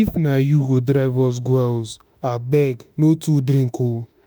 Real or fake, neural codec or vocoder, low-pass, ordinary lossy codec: fake; autoencoder, 48 kHz, 32 numbers a frame, DAC-VAE, trained on Japanese speech; none; none